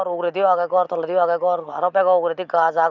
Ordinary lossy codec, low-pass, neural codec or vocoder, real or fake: none; 7.2 kHz; none; real